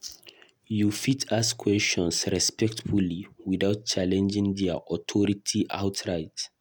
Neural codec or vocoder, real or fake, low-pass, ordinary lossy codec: none; real; none; none